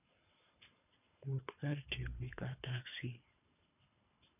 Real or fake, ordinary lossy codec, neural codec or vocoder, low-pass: fake; none; codec, 24 kHz, 3 kbps, HILCodec; 3.6 kHz